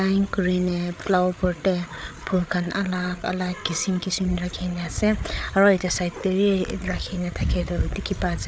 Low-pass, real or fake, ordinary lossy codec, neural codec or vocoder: none; fake; none; codec, 16 kHz, 8 kbps, FreqCodec, larger model